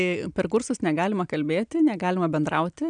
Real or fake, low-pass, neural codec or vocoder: real; 9.9 kHz; none